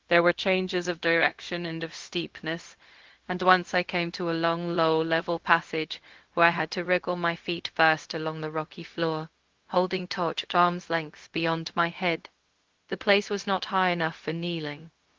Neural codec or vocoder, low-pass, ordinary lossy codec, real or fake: codec, 16 kHz, 0.4 kbps, LongCat-Audio-Codec; 7.2 kHz; Opus, 16 kbps; fake